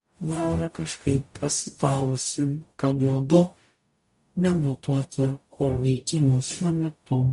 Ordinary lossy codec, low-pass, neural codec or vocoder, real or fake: MP3, 48 kbps; 14.4 kHz; codec, 44.1 kHz, 0.9 kbps, DAC; fake